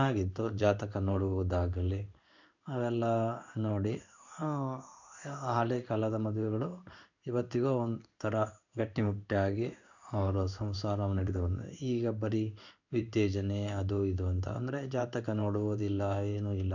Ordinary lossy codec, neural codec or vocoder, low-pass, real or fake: none; codec, 16 kHz in and 24 kHz out, 1 kbps, XY-Tokenizer; 7.2 kHz; fake